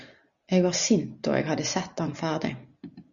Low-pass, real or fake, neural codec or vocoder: 7.2 kHz; real; none